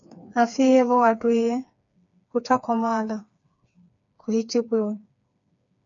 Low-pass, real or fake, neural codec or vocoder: 7.2 kHz; fake; codec, 16 kHz, 4 kbps, FreqCodec, smaller model